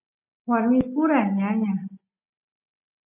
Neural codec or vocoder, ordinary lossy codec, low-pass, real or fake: none; MP3, 32 kbps; 3.6 kHz; real